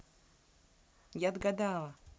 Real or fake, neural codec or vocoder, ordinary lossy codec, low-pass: real; none; none; none